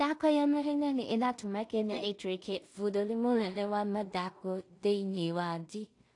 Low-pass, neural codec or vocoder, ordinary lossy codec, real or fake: 10.8 kHz; codec, 16 kHz in and 24 kHz out, 0.4 kbps, LongCat-Audio-Codec, two codebook decoder; AAC, 48 kbps; fake